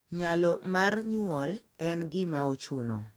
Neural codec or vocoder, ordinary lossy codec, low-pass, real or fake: codec, 44.1 kHz, 2.6 kbps, DAC; none; none; fake